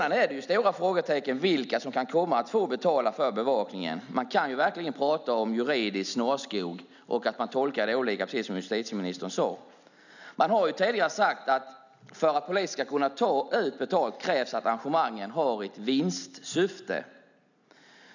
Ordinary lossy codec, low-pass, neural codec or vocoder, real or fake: none; 7.2 kHz; none; real